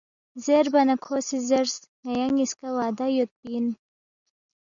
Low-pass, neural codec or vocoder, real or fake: 7.2 kHz; none; real